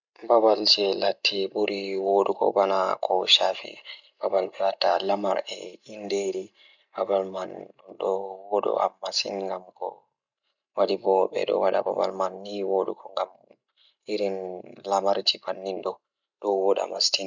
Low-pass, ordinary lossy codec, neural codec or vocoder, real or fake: 7.2 kHz; none; none; real